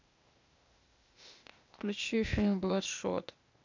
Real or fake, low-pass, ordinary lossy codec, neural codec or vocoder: fake; 7.2 kHz; MP3, 64 kbps; codec, 16 kHz, 0.8 kbps, ZipCodec